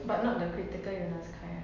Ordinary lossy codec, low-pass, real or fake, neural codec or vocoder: MP3, 64 kbps; 7.2 kHz; real; none